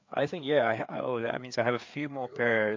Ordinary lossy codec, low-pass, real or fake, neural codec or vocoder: MP3, 48 kbps; 7.2 kHz; fake; codec, 16 kHz, 4 kbps, FreqCodec, larger model